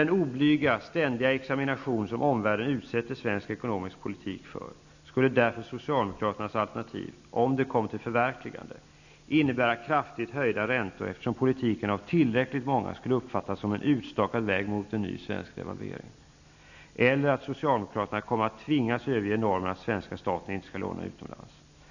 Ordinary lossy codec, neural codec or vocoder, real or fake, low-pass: none; none; real; 7.2 kHz